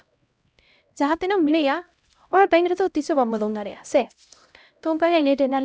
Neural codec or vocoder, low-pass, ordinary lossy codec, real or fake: codec, 16 kHz, 0.5 kbps, X-Codec, HuBERT features, trained on LibriSpeech; none; none; fake